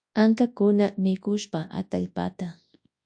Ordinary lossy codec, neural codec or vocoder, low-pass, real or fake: AAC, 64 kbps; codec, 24 kHz, 0.9 kbps, WavTokenizer, large speech release; 9.9 kHz; fake